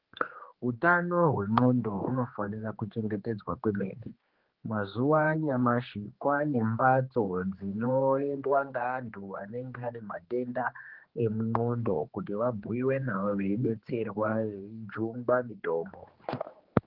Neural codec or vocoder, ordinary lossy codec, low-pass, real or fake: codec, 16 kHz, 2 kbps, X-Codec, HuBERT features, trained on general audio; Opus, 16 kbps; 5.4 kHz; fake